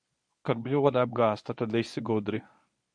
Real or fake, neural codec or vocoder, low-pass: fake; codec, 24 kHz, 0.9 kbps, WavTokenizer, medium speech release version 1; 9.9 kHz